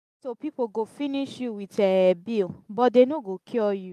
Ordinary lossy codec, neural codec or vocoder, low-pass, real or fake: MP3, 96 kbps; none; 14.4 kHz; real